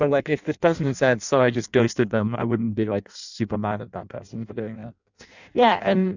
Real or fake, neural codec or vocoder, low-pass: fake; codec, 16 kHz in and 24 kHz out, 0.6 kbps, FireRedTTS-2 codec; 7.2 kHz